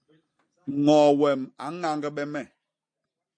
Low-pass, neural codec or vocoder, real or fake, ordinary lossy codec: 9.9 kHz; none; real; MP3, 48 kbps